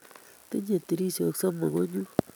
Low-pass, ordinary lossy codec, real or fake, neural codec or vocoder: none; none; real; none